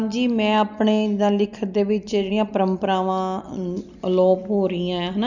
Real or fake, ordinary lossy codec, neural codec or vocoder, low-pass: real; none; none; 7.2 kHz